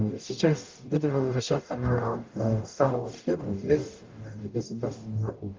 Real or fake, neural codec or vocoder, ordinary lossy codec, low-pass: fake; codec, 44.1 kHz, 0.9 kbps, DAC; Opus, 24 kbps; 7.2 kHz